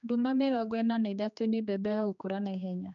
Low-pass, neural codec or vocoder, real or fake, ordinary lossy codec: 7.2 kHz; codec, 16 kHz, 2 kbps, X-Codec, HuBERT features, trained on general audio; fake; none